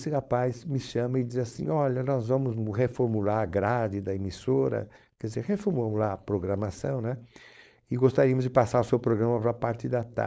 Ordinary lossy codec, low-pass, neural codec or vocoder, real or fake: none; none; codec, 16 kHz, 4.8 kbps, FACodec; fake